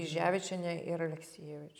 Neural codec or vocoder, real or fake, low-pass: none; real; 19.8 kHz